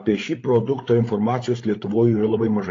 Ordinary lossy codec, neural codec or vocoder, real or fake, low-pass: AAC, 32 kbps; codec, 16 kHz, 8 kbps, FreqCodec, larger model; fake; 7.2 kHz